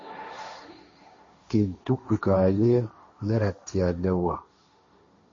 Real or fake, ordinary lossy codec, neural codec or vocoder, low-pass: fake; MP3, 32 kbps; codec, 16 kHz, 1.1 kbps, Voila-Tokenizer; 7.2 kHz